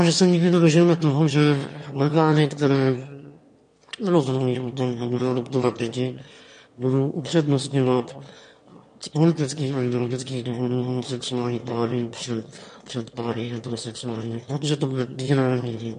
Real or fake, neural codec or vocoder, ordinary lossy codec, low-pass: fake; autoencoder, 22.05 kHz, a latent of 192 numbers a frame, VITS, trained on one speaker; MP3, 48 kbps; 9.9 kHz